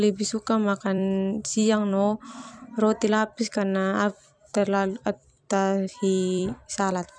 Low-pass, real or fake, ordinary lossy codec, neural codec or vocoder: 9.9 kHz; fake; none; vocoder, 44.1 kHz, 128 mel bands every 256 samples, BigVGAN v2